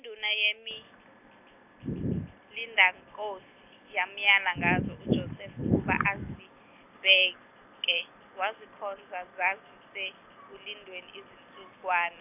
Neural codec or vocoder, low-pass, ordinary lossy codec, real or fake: none; 3.6 kHz; none; real